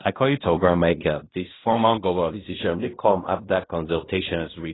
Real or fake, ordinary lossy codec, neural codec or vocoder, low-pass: fake; AAC, 16 kbps; codec, 16 kHz in and 24 kHz out, 0.4 kbps, LongCat-Audio-Codec, fine tuned four codebook decoder; 7.2 kHz